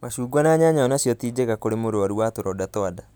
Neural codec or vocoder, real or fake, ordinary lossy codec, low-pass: none; real; none; none